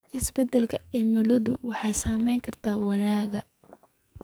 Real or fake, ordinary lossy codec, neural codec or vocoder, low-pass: fake; none; codec, 44.1 kHz, 2.6 kbps, SNAC; none